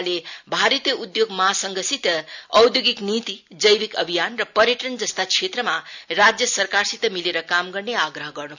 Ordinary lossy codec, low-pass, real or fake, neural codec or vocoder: none; 7.2 kHz; real; none